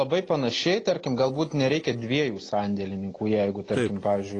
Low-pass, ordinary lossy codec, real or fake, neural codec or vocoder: 9.9 kHz; AAC, 32 kbps; real; none